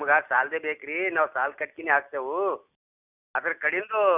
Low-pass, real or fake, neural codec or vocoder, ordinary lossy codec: 3.6 kHz; real; none; none